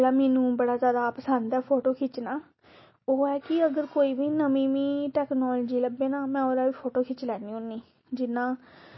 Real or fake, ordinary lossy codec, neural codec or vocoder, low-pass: real; MP3, 24 kbps; none; 7.2 kHz